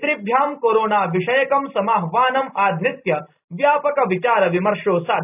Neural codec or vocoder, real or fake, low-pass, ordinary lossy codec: none; real; 3.6 kHz; none